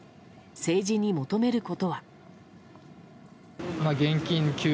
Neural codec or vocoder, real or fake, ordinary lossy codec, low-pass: none; real; none; none